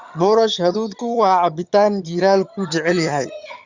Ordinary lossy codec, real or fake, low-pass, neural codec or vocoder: Opus, 64 kbps; fake; 7.2 kHz; vocoder, 22.05 kHz, 80 mel bands, HiFi-GAN